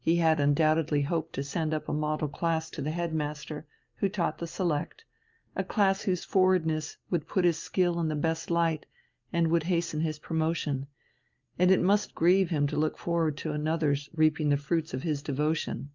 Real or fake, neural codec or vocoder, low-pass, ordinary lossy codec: real; none; 7.2 kHz; Opus, 24 kbps